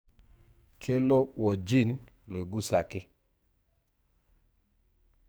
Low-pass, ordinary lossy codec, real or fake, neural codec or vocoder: none; none; fake; codec, 44.1 kHz, 2.6 kbps, SNAC